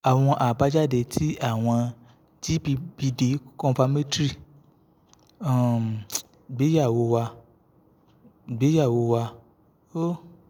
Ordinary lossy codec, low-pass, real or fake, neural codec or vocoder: none; 19.8 kHz; real; none